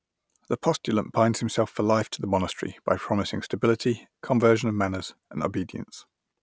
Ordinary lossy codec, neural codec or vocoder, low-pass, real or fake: none; none; none; real